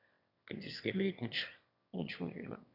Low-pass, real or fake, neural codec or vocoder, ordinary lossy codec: 5.4 kHz; fake; autoencoder, 22.05 kHz, a latent of 192 numbers a frame, VITS, trained on one speaker; none